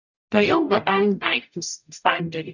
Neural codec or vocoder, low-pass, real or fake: codec, 44.1 kHz, 0.9 kbps, DAC; 7.2 kHz; fake